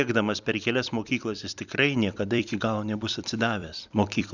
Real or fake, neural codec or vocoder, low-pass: fake; vocoder, 44.1 kHz, 128 mel bands every 256 samples, BigVGAN v2; 7.2 kHz